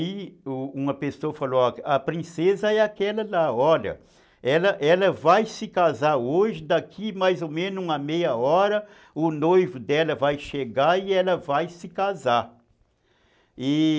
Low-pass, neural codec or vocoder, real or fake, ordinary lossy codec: none; none; real; none